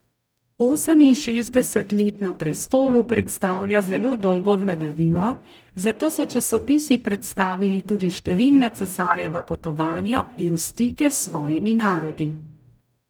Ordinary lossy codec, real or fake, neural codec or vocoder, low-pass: none; fake; codec, 44.1 kHz, 0.9 kbps, DAC; none